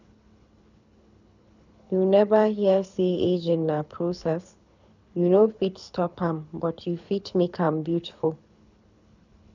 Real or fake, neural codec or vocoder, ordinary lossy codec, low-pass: fake; codec, 24 kHz, 6 kbps, HILCodec; none; 7.2 kHz